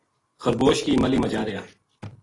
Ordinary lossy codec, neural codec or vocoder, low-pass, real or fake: AAC, 32 kbps; none; 10.8 kHz; real